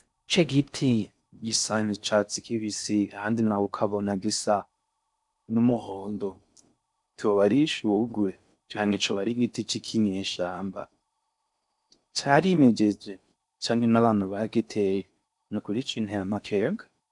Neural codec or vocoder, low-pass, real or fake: codec, 16 kHz in and 24 kHz out, 0.6 kbps, FocalCodec, streaming, 4096 codes; 10.8 kHz; fake